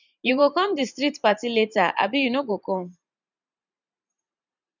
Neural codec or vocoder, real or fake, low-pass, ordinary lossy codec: vocoder, 44.1 kHz, 128 mel bands every 512 samples, BigVGAN v2; fake; 7.2 kHz; none